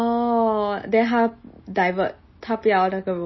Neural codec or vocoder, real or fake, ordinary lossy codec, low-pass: none; real; MP3, 24 kbps; 7.2 kHz